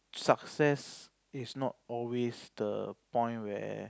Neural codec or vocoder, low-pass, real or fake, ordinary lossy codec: none; none; real; none